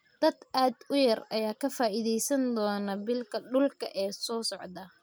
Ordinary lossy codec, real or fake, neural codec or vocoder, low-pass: none; real; none; none